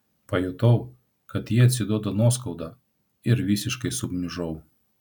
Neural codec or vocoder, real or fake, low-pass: none; real; 19.8 kHz